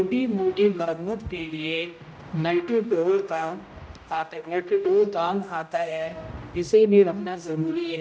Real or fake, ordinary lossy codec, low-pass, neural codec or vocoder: fake; none; none; codec, 16 kHz, 0.5 kbps, X-Codec, HuBERT features, trained on general audio